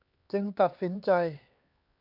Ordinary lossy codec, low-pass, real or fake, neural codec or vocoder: Opus, 64 kbps; 5.4 kHz; fake; codec, 16 kHz, 4 kbps, X-Codec, HuBERT features, trained on LibriSpeech